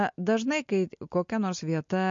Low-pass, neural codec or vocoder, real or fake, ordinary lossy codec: 7.2 kHz; none; real; MP3, 48 kbps